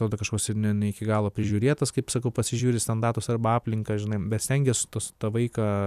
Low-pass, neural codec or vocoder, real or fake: 14.4 kHz; vocoder, 44.1 kHz, 128 mel bands every 256 samples, BigVGAN v2; fake